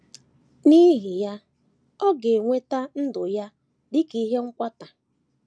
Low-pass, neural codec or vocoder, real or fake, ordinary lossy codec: none; none; real; none